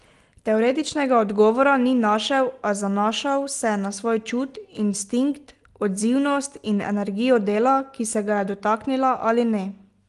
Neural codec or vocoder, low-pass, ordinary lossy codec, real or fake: none; 10.8 kHz; Opus, 24 kbps; real